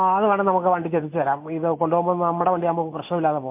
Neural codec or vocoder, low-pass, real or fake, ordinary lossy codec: none; 3.6 kHz; real; MP3, 24 kbps